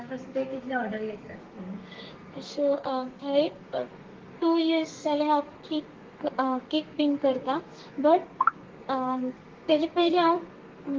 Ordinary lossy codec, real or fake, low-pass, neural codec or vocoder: Opus, 16 kbps; fake; 7.2 kHz; codec, 32 kHz, 1.9 kbps, SNAC